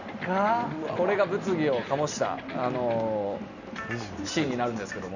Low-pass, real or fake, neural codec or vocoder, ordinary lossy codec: 7.2 kHz; real; none; none